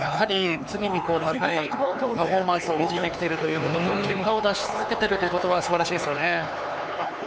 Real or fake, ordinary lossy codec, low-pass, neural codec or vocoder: fake; none; none; codec, 16 kHz, 4 kbps, X-Codec, HuBERT features, trained on LibriSpeech